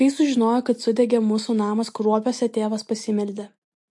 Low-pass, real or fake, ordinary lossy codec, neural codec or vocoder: 10.8 kHz; real; MP3, 48 kbps; none